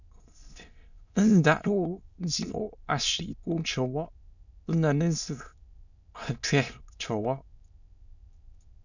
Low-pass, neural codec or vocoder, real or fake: 7.2 kHz; autoencoder, 22.05 kHz, a latent of 192 numbers a frame, VITS, trained on many speakers; fake